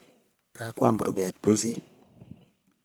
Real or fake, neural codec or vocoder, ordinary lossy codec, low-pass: fake; codec, 44.1 kHz, 1.7 kbps, Pupu-Codec; none; none